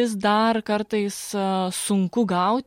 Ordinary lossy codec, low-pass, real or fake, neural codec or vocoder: MP3, 64 kbps; 19.8 kHz; real; none